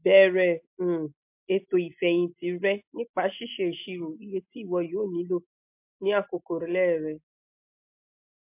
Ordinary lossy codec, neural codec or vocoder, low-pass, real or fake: MP3, 32 kbps; none; 3.6 kHz; real